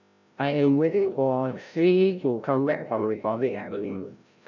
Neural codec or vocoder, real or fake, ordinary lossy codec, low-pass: codec, 16 kHz, 0.5 kbps, FreqCodec, larger model; fake; none; 7.2 kHz